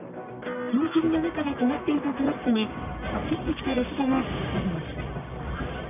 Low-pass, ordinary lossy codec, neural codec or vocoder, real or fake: 3.6 kHz; none; codec, 44.1 kHz, 1.7 kbps, Pupu-Codec; fake